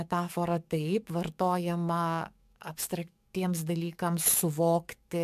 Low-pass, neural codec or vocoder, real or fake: 14.4 kHz; codec, 44.1 kHz, 7.8 kbps, DAC; fake